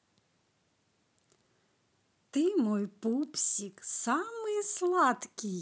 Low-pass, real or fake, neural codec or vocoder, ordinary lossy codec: none; real; none; none